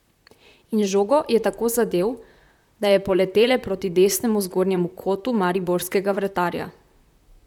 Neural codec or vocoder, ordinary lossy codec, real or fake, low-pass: vocoder, 44.1 kHz, 128 mel bands, Pupu-Vocoder; none; fake; 19.8 kHz